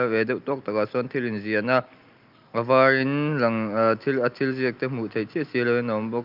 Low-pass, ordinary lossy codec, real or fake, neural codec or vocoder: 5.4 kHz; Opus, 24 kbps; real; none